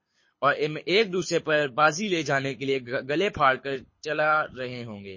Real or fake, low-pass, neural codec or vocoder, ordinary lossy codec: fake; 7.2 kHz; codec, 24 kHz, 6 kbps, HILCodec; MP3, 32 kbps